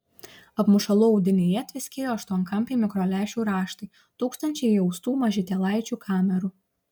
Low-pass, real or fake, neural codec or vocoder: 19.8 kHz; real; none